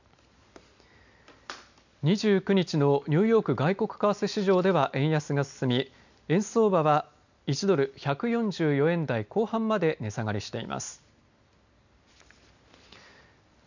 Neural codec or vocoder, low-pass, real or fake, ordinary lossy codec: none; 7.2 kHz; real; none